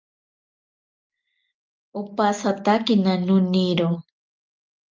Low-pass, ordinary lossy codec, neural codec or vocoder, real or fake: 7.2 kHz; Opus, 32 kbps; none; real